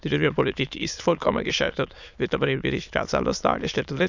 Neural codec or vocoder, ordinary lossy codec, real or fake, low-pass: autoencoder, 22.05 kHz, a latent of 192 numbers a frame, VITS, trained on many speakers; none; fake; 7.2 kHz